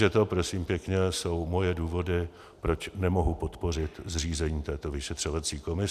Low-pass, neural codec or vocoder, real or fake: 14.4 kHz; none; real